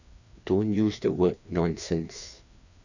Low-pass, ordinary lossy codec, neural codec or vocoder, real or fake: 7.2 kHz; none; codec, 16 kHz, 2 kbps, FreqCodec, larger model; fake